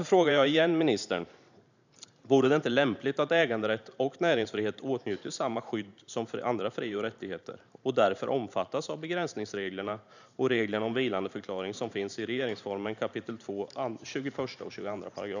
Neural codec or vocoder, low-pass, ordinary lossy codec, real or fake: vocoder, 44.1 kHz, 128 mel bands every 512 samples, BigVGAN v2; 7.2 kHz; none; fake